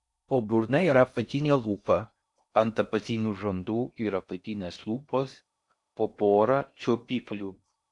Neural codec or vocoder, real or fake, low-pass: codec, 16 kHz in and 24 kHz out, 0.6 kbps, FocalCodec, streaming, 4096 codes; fake; 10.8 kHz